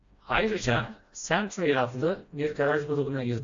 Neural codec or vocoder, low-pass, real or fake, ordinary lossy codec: codec, 16 kHz, 1 kbps, FreqCodec, smaller model; 7.2 kHz; fake; AAC, 64 kbps